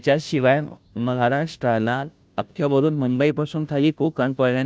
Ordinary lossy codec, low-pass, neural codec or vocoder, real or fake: none; none; codec, 16 kHz, 0.5 kbps, FunCodec, trained on Chinese and English, 25 frames a second; fake